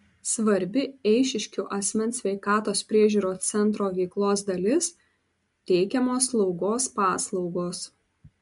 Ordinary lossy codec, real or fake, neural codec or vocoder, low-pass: MP3, 64 kbps; real; none; 10.8 kHz